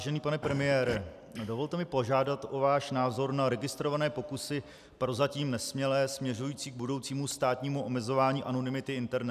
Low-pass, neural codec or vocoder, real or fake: 14.4 kHz; none; real